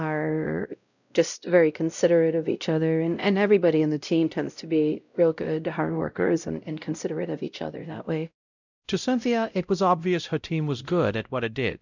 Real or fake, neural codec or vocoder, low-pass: fake; codec, 16 kHz, 0.5 kbps, X-Codec, WavLM features, trained on Multilingual LibriSpeech; 7.2 kHz